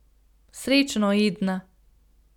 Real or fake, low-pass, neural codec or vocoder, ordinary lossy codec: real; 19.8 kHz; none; none